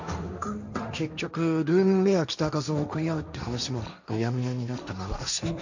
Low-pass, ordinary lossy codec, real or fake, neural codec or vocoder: 7.2 kHz; none; fake; codec, 16 kHz, 1.1 kbps, Voila-Tokenizer